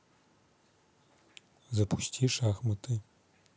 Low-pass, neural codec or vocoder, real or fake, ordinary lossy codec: none; none; real; none